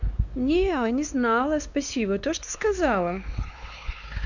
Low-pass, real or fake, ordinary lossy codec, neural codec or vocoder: 7.2 kHz; fake; none; codec, 16 kHz, 2 kbps, X-Codec, WavLM features, trained on Multilingual LibriSpeech